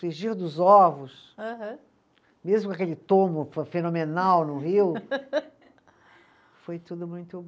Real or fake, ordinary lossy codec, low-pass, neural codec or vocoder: real; none; none; none